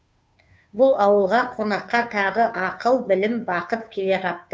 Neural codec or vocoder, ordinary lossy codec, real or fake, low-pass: codec, 16 kHz, 2 kbps, FunCodec, trained on Chinese and English, 25 frames a second; none; fake; none